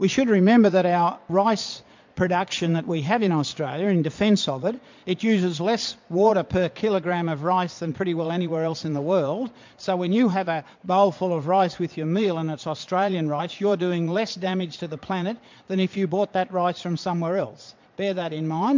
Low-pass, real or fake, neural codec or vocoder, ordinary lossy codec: 7.2 kHz; fake; vocoder, 22.05 kHz, 80 mel bands, Vocos; MP3, 64 kbps